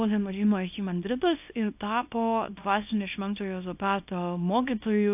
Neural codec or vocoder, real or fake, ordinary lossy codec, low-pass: codec, 24 kHz, 0.9 kbps, WavTokenizer, small release; fake; AAC, 32 kbps; 3.6 kHz